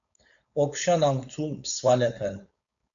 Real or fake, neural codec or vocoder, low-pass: fake; codec, 16 kHz, 4.8 kbps, FACodec; 7.2 kHz